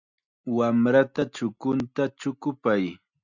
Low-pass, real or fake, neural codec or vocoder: 7.2 kHz; real; none